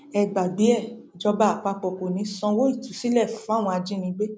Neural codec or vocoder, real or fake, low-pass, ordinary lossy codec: none; real; none; none